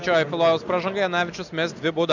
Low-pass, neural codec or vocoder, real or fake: 7.2 kHz; none; real